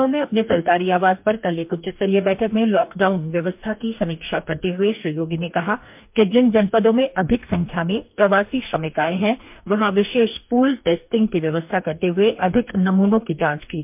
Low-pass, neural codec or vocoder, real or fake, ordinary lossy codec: 3.6 kHz; codec, 44.1 kHz, 2.6 kbps, DAC; fake; MP3, 32 kbps